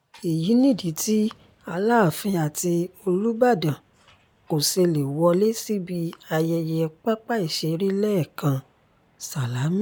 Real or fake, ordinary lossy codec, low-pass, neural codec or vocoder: real; none; none; none